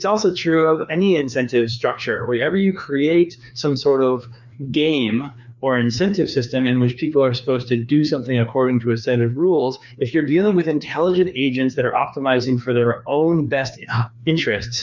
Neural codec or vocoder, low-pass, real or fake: codec, 16 kHz, 2 kbps, FreqCodec, larger model; 7.2 kHz; fake